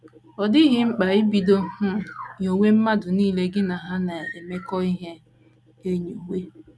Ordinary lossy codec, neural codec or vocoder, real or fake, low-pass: none; none; real; none